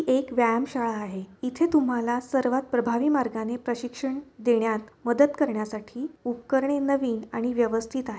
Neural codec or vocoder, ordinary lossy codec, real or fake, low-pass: none; none; real; none